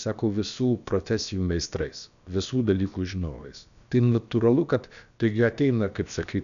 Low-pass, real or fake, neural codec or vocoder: 7.2 kHz; fake; codec, 16 kHz, about 1 kbps, DyCAST, with the encoder's durations